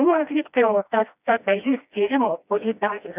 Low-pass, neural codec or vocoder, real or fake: 3.6 kHz; codec, 16 kHz, 1 kbps, FreqCodec, smaller model; fake